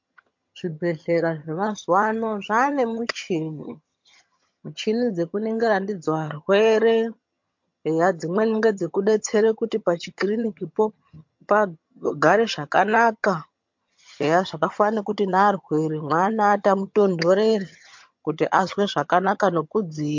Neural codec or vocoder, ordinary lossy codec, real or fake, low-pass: vocoder, 22.05 kHz, 80 mel bands, HiFi-GAN; MP3, 48 kbps; fake; 7.2 kHz